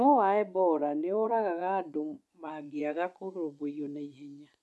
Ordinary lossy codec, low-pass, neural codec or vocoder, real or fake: none; none; vocoder, 24 kHz, 100 mel bands, Vocos; fake